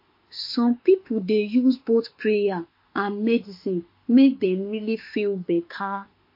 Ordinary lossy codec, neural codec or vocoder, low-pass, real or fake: MP3, 32 kbps; autoencoder, 48 kHz, 32 numbers a frame, DAC-VAE, trained on Japanese speech; 5.4 kHz; fake